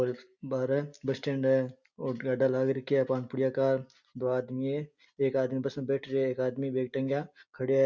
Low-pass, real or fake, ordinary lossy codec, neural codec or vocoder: 7.2 kHz; real; none; none